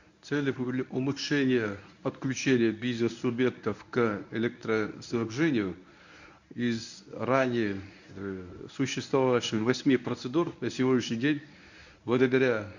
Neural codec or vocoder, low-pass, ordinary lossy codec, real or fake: codec, 24 kHz, 0.9 kbps, WavTokenizer, medium speech release version 1; 7.2 kHz; none; fake